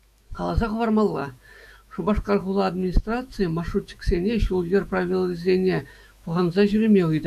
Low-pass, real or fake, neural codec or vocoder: 14.4 kHz; fake; autoencoder, 48 kHz, 128 numbers a frame, DAC-VAE, trained on Japanese speech